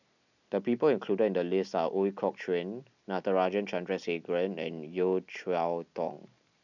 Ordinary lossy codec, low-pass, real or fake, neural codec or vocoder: none; 7.2 kHz; real; none